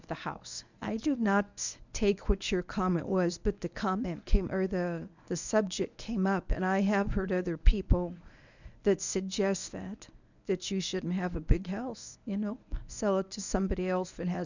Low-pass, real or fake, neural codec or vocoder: 7.2 kHz; fake; codec, 24 kHz, 0.9 kbps, WavTokenizer, medium speech release version 1